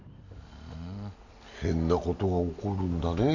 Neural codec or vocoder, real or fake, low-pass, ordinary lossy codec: none; real; 7.2 kHz; none